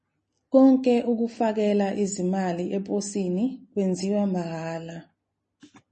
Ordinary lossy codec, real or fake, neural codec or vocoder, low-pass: MP3, 32 kbps; real; none; 10.8 kHz